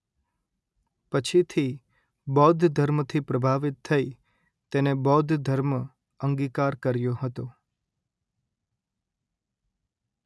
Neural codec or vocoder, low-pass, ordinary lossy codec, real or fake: none; none; none; real